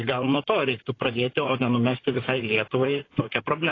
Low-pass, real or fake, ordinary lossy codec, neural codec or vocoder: 7.2 kHz; fake; AAC, 32 kbps; vocoder, 44.1 kHz, 128 mel bands, Pupu-Vocoder